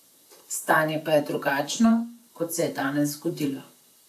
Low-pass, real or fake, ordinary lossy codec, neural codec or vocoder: 14.4 kHz; fake; none; vocoder, 44.1 kHz, 128 mel bands, Pupu-Vocoder